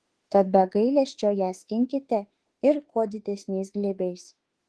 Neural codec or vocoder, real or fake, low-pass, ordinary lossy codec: autoencoder, 48 kHz, 32 numbers a frame, DAC-VAE, trained on Japanese speech; fake; 10.8 kHz; Opus, 16 kbps